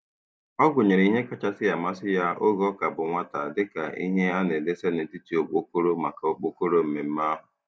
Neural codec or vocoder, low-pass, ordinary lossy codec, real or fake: none; none; none; real